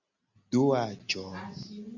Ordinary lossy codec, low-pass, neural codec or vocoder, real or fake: Opus, 64 kbps; 7.2 kHz; none; real